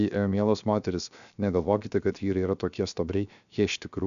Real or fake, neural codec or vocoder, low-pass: fake; codec, 16 kHz, 0.7 kbps, FocalCodec; 7.2 kHz